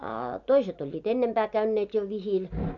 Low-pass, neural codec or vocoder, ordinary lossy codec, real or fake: 7.2 kHz; none; none; real